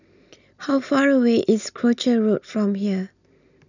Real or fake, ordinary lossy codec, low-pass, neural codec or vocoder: real; none; 7.2 kHz; none